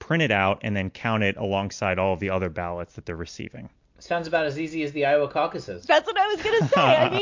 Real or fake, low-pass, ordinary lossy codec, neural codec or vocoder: real; 7.2 kHz; MP3, 48 kbps; none